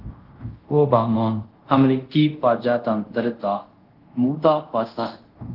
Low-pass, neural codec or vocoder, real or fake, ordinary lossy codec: 5.4 kHz; codec, 24 kHz, 0.5 kbps, DualCodec; fake; Opus, 16 kbps